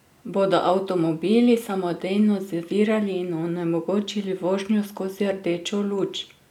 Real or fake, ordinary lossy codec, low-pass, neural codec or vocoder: real; none; 19.8 kHz; none